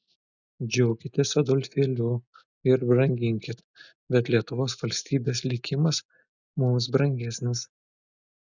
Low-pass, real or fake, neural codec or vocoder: 7.2 kHz; real; none